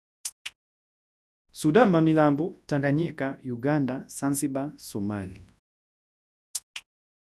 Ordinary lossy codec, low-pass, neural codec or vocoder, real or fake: none; none; codec, 24 kHz, 0.9 kbps, WavTokenizer, large speech release; fake